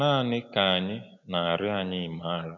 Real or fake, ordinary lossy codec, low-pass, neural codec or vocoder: real; none; 7.2 kHz; none